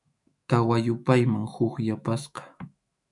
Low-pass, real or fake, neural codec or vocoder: 10.8 kHz; fake; autoencoder, 48 kHz, 128 numbers a frame, DAC-VAE, trained on Japanese speech